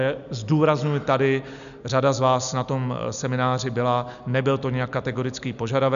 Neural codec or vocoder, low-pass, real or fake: none; 7.2 kHz; real